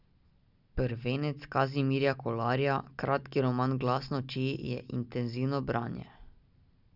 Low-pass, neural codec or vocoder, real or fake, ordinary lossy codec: 5.4 kHz; none; real; none